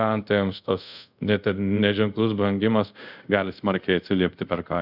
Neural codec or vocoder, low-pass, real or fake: codec, 24 kHz, 0.5 kbps, DualCodec; 5.4 kHz; fake